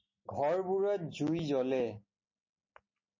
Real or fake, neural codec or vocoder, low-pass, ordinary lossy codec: real; none; 7.2 kHz; MP3, 32 kbps